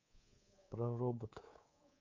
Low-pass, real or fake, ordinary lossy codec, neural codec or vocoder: 7.2 kHz; fake; MP3, 48 kbps; codec, 24 kHz, 3.1 kbps, DualCodec